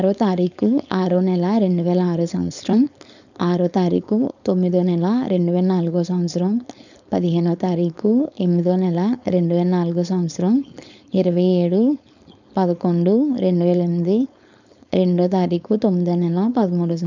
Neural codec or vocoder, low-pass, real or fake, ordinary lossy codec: codec, 16 kHz, 4.8 kbps, FACodec; 7.2 kHz; fake; none